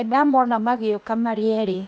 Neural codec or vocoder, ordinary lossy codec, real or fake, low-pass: codec, 16 kHz, 0.8 kbps, ZipCodec; none; fake; none